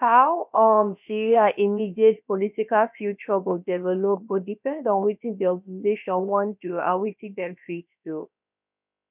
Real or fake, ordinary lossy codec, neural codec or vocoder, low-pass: fake; none; codec, 16 kHz, about 1 kbps, DyCAST, with the encoder's durations; 3.6 kHz